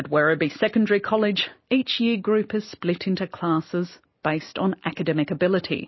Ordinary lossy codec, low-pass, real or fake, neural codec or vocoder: MP3, 24 kbps; 7.2 kHz; real; none